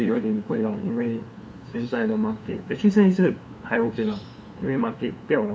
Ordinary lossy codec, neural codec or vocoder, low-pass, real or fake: none; codec, 16 kHz, 2 kbps, FunCodec, trained on LibriTTS, 25 frames a second; none; fake